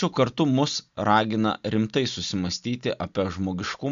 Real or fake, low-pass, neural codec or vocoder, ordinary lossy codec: real; 7.2 kHz; none; MP3, 64 kbps